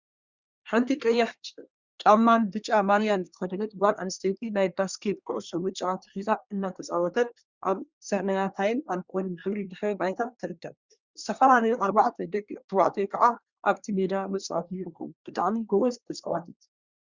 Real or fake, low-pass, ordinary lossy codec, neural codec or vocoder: fake; 7.2 kHz; Opus, 64 kbps; codec, 24 kHz, 1 kbps, SNAC